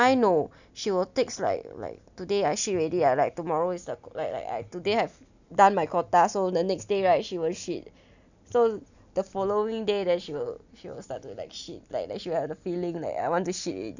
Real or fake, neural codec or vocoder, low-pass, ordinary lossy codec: real; none; 7.2 kHz; none